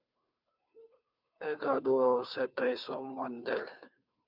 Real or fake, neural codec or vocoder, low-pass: fake; codec, 16 kHz, 2 kbps, FunCodec, trained on Chinese and English, 25 frames a second; 5.4 kHz